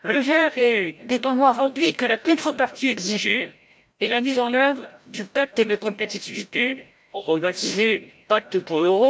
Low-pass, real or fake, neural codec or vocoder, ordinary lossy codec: none; fake; codec, 16 kHz, 0.5 kbps, FreqCodec, larger model; none